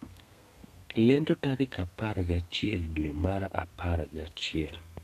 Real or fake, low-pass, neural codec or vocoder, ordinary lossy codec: fake; 14.4 kHz; codec, 32 kHz, 1.9 kbps, SNAC; none